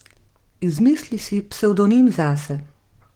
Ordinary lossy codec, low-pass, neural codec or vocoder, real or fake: Opus, 16 kbps; 19.8 kHz; codec, 44.1 kHz, 7.8 kbps, DAC; fake